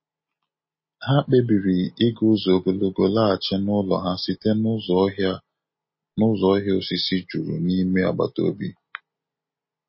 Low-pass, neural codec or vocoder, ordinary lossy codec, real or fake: 7.2 kHz; none; MP3, 24 kbps; real